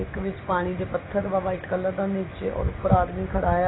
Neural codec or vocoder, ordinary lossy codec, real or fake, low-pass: none; AAC, 16 kbps; real; 7.2 kHz